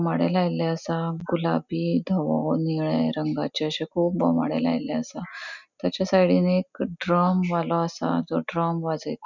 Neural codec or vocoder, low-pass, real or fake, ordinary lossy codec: none; 7.2 kHz; real; none